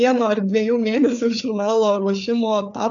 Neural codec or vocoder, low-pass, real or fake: codec, 16 kHz, 4 kbps, FreqCodec, larger model; 7.2 kHz; fake